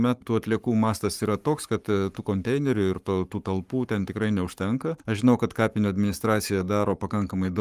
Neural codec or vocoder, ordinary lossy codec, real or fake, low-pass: codec, 44.1 kHz, 7.8 kbps, Pupu-Codec; Opus, 32 kbps; fake; 14.4 kHz